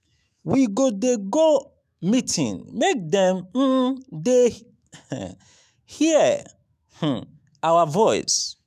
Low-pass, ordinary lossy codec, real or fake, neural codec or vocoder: 14.4 kHz; none; fake; autoencoder, 48 kHz, 128 numbers a frame, DAC-VAE, trained on Japanese speech